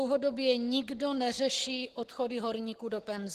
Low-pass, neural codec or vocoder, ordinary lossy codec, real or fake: 14.4 kHz; codec, 44.1 kHz, 7.8 kbps, Pupu-Codec; Opus, 16 kbps; fake